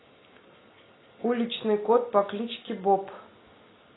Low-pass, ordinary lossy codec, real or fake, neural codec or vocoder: 7.2 kHz; AAC, 16 kbps; real; none